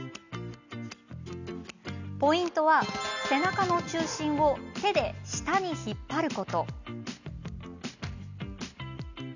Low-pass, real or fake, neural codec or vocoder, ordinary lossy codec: 7.2 kHz; real; none; none